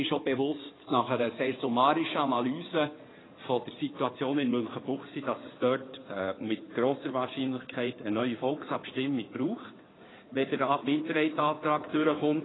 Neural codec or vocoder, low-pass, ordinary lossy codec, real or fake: codec, 16 kHz in and 24 kHz out, 2.2 kbps, FireRedTTS-2 codec; 7.2 kHz; AAC, 16 kbps; fake